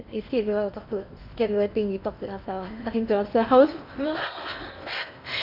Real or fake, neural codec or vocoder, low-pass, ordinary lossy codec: fake; codec, 16 kHz in and 24 kHz out, 0.8 kbps, FocalCodec, streaming, 65536 codes; 5.4 kHz; none